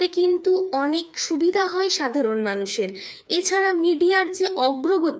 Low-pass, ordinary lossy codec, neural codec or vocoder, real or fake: none; none; codec, 16 kHz, 2 kbps, FreqCodec, larger model; fake